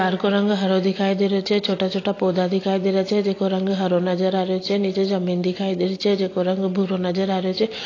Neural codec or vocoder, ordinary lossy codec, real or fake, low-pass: none; AAC, 32 kbps; real; 7.2 kHz